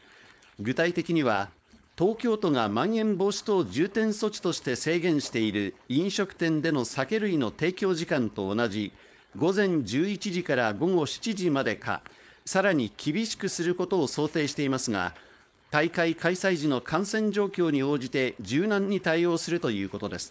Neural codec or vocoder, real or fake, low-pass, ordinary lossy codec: codec, 16 kHz, 4.8 kbps, FACodec; fake; none; none